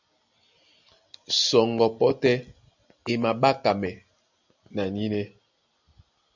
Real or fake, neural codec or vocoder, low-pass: real; none; 7.2 kHz